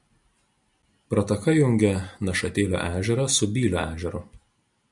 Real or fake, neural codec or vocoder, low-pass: real; none; 10.8 kHz